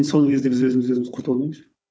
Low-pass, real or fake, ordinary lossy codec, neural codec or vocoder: none; fake; none; codec, 16 kHz, 4 kbps, FunCodec, trained on Chinese and English, 50 frames a second